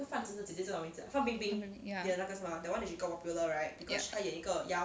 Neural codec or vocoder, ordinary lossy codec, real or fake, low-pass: none; none; real; none